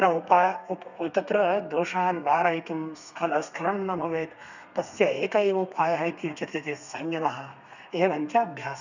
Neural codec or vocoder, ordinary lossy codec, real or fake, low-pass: codec, 32 kHz, 1.9 kbps, SNAC; none; fake; 7.2 kHz